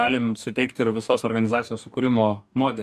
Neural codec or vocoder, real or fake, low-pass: codec, 44.1 kHz, 2.6 kbps, DAC; fake; 14.4 kHz